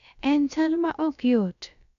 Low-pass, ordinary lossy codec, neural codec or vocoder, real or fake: 7.2 kHz; none; codec, 16 kHz, about 1 kbps, DyCAST, with the encoder's durations; fake